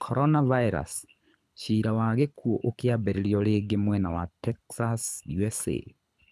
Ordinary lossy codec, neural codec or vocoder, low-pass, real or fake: none; codec, 24 kHz, 6 kbps, HILCodec; none; fake